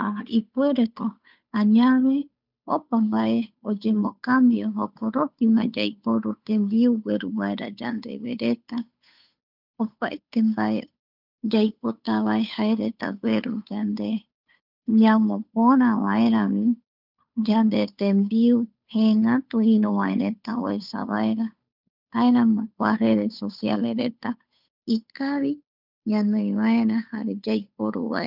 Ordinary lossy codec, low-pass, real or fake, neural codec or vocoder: none; 5.4 kHz; fake; codec, 16 kHz, 8 kbps, FunCodec, trained on Chinese and English, 25 frames a second